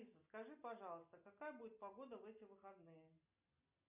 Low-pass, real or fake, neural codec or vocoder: 3.6 kHz; real; none